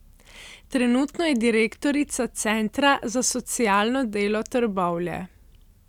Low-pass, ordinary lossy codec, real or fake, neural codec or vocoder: 19.8 kHz; none; real; none